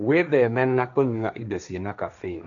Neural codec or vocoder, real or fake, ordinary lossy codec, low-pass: codec, 16 kHz, 1.1 kbps, Voila-Tokenizer; fake; none; 7.2 kHz